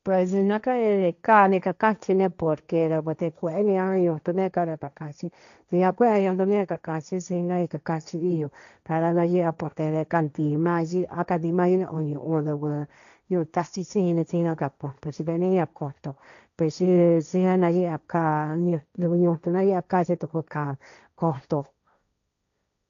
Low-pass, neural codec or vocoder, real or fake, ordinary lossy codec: 7.2 kHz; codec, 16 kHz, 1.1 kbps, Voila-Tokenizer; fake; none